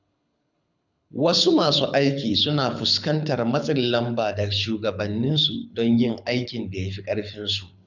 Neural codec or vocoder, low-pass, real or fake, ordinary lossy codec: codec, 24 kHz, 6 kbps, HILCodec; 7.2 kHz; fake; none